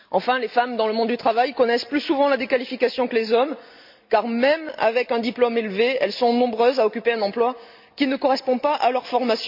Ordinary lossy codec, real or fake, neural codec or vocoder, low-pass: MP3, 48 kbps; real; none; 5.4 kHz